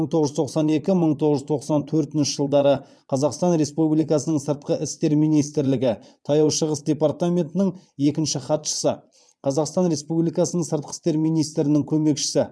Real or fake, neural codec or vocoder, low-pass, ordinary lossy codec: fake; vocoder, 22.05 kHz, 80 mel bands, Vocos; none; none